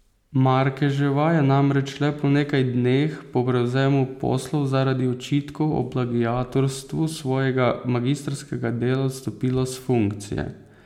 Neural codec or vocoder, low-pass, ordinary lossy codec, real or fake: none; 19.8 kHz; MP3, 96 kbps; real